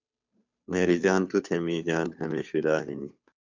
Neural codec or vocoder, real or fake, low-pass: codec, 16 kHz, 2 kbps, FunCodec, trained on Chinese and English, 25 frames a second; fake; 7.2 kHz